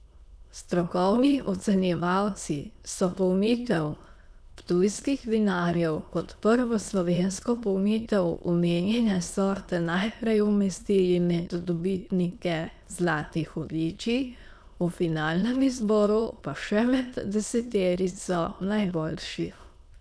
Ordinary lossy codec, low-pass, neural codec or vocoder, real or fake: none; none; autoencoder, 22.05 kHz, a latent of 192 numbers a frame, VITS, trained on many speakers; fake